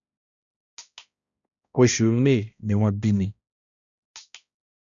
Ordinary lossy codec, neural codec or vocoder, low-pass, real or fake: none; codec, 16 kHz, 1 kbps, X-Codec, HuBERT features, trained on balanced general audio; 7.2 kHz; fake